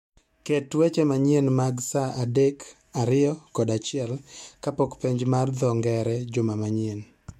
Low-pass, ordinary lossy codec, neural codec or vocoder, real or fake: 19.8 kHz; MP3, 64 kbps; autoencoder, 48 kHz, 128 numbers a frame, DAC-VAE, trained on Japanese speech; fake